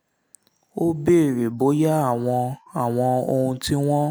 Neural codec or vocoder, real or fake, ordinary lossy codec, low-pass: none; real; none; none